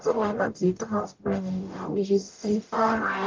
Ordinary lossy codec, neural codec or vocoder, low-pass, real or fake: Opus, 24 kbps; codec, 44.1 kHz, 0.9 kbps, DAC; 7.2 kHz; fake